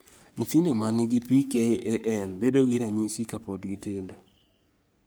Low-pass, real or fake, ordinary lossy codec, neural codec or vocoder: none; fake; none; codec, 44.1 kHz, 3.4 kbps, Pupu-Codec